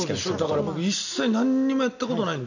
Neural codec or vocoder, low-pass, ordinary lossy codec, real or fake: none; 7.2 kHz; none; real